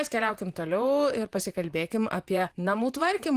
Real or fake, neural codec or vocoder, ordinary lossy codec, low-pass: fake; vocoder, 44.1 kHz, 128 mel bands, Pupu-Vocoder; Opus, 24 kbps; 14.4 kHz